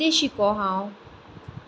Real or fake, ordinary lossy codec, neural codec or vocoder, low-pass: real; none; none; none